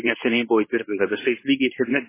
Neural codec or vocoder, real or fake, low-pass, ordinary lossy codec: codec, 24 kHz, 0.9 kbps, WavTokenizer, medium speech release version 2; fake; 3.6 kHz; MP3, 16 kbps